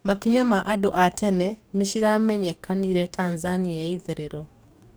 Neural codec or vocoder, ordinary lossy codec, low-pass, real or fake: codec, 44.1 kHz, 2.6 kbps, DAC; none; none; fake